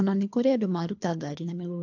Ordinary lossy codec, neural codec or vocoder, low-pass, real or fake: AAC, 48 kbps; codec, 24 kHz, 3 kbps, HILCodec; 7.2 kHz; fake